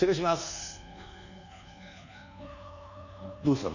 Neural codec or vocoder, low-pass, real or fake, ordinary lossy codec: codec, 24 kHz, 1.2 kbps, DualCodec; 7.2 kHz; fake; none